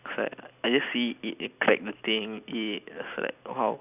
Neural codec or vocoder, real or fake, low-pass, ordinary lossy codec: none; real; 3.6 kHz; none